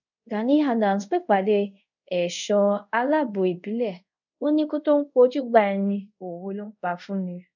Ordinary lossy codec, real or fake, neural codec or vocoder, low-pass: none; fake; codec, 24 kHz, 0.5 kbps, DualCodec; 7.2 kHz